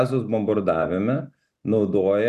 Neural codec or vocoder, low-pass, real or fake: none; 14.4 kHz; real